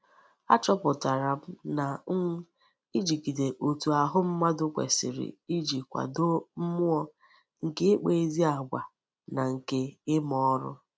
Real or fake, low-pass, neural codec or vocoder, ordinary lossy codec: real; none; none; none